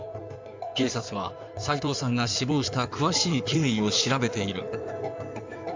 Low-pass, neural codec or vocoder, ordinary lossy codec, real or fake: 7.2 kHz; codec, 16 kHz in and 24 kHz out, 2.2 kbps, FireRedTTS-2 codec; MP3, 64 kbps; fake